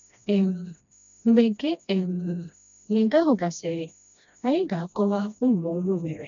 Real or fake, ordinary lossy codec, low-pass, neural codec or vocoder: fake; none; 7.2 kHz; codec, 16 kHz, 1 kbps, FreqCodec, smaller model